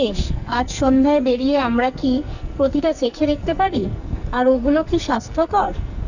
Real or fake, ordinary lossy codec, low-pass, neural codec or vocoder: fake; none; 7.2 kHz; codec, 44.1 kHz, 2.6 kbps, SNAC